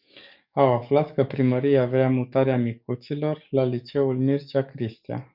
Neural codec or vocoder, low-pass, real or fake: codec, 44.1 kHz, 7.8 kbps, DAC; 5.4 kHz; fake